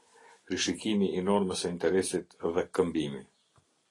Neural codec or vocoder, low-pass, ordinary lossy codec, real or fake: none; 10.8 kHz; AAC, 32 kbps; real